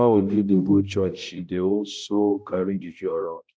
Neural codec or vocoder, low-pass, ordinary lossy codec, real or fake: codec, 16 kHz, 0.5 kbps, X-Codec, HuBERT features, trained on balanced general audio; none; none; fake